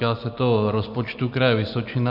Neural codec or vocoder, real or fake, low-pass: none; real; 5.4 kHz